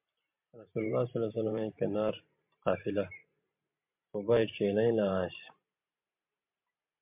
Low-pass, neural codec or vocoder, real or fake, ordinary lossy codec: 3.6 kHz; vocoder, 44.1 kHz, 128 mel bands every 512 samples, BigVGAN v2; fake; MP3, 32 kbps